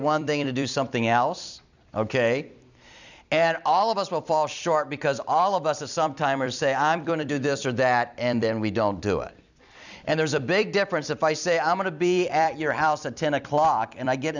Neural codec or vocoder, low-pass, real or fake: vocoder, 44.1 kHz, 128 mel bands every 256 samples, BigVGAN v2; 7.2 kHz; fake